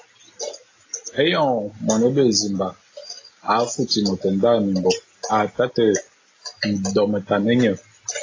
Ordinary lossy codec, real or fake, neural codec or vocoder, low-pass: AAC, 32 kbps; real; none; 7.2 kHz